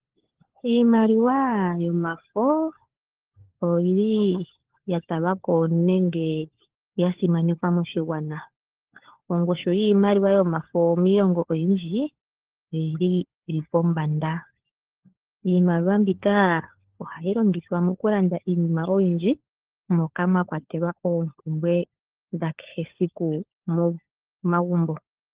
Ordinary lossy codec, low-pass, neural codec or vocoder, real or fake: Opus, 16 kbps; 3.6 kHz; codec, 16 kHz, 4 kbps, FunCodec, trained on LibriTTS, 50 frames a second; fake